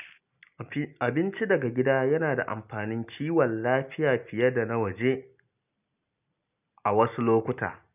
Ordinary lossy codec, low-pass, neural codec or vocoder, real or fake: none; 3.6 kHz; none; real